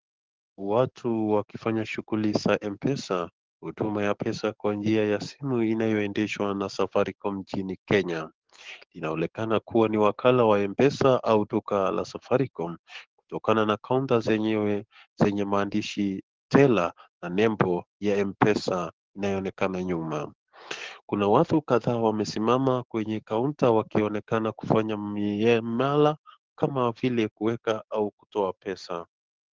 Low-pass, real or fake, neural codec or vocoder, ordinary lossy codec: 7.2 kHz; fake; codec, 44.1 kHz, 7.8 kbps, DAC; Opus, 16 kbps